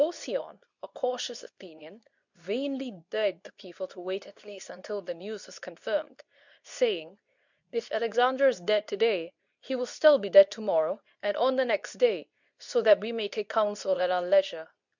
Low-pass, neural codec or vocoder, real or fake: 7.2 kHz; codec, 24 kHz, 0.9 kbps, WavTokenizer, medium speech release version 2; fake